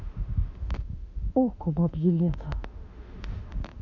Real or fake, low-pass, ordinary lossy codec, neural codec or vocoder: fake; 7.2 kHz; none; autoencoder, 48 kHz, 32 numbers a frame, DAC-VAE, trained on Japanese speech